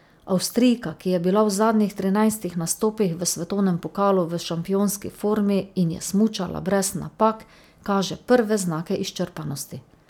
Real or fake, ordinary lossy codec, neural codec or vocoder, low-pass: real; none; none; 19.8 kHz